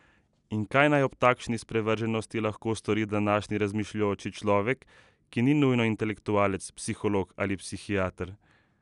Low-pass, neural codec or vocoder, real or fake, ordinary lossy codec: 10.8 kHz; none; real; none